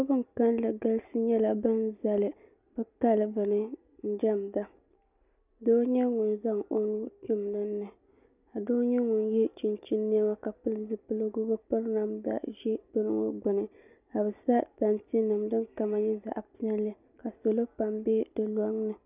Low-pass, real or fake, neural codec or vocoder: 3.6 kHz; real; none